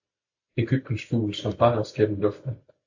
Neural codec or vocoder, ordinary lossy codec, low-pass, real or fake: none; AAC, 48 kbps; 7.2 kHz; real